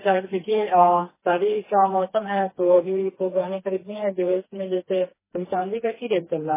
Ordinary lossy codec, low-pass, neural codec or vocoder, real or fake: MP3, 16 kbps; 3.6 kHz; codec, 16 kHz, 2 kbps, FreqCodec, smaller model; fake